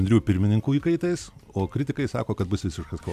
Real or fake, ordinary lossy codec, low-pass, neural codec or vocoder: fake; AAC, 96 kbps; 14.4 kHz; vocoder, 44.1 kHz, 128 mel bands every 256 samples, BigVGAN v2